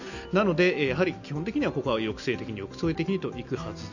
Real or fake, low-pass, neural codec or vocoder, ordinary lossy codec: real; 7.2 kHz; none; none